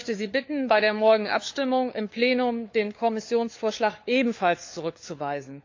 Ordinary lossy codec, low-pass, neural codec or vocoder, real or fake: AAC, 48 kbps; 7.2 kHz; codec, 16 kHz, 4 kbps, FunCodec, trained on LibriTTS, 50 frames a second; fake